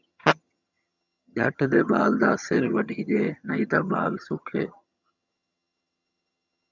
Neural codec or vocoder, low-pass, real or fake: vocoder, 22.05 kHz, 80 mel bands, HiFi-GAN; 7.2 kHz; fake